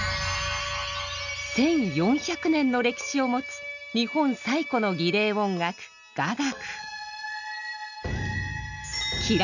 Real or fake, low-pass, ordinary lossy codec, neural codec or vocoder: real; 7.2 kHz; none; none